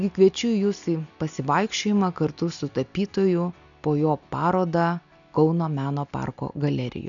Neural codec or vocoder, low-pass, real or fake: none; 7.2 kHz; real